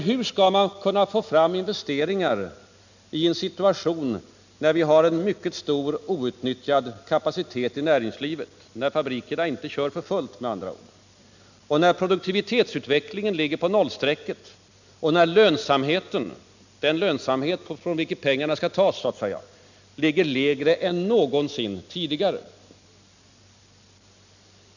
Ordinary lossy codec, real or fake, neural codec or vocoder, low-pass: none; real; none; 7.2 kHz